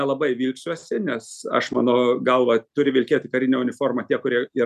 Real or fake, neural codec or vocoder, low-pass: real; none; 14.4 kHz